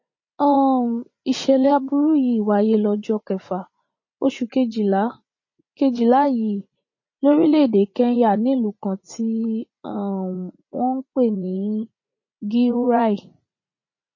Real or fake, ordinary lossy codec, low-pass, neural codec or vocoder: fake; MP3, 32 kbps; 7.2 kHz; vocoder, 44.1 kHz, 80 mel bands, Vocos